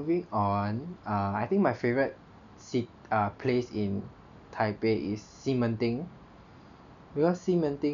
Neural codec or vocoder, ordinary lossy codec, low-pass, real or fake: none; none; 7.2 kHz; real